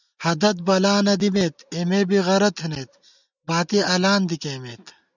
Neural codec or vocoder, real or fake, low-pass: none; real; 7.2 kHz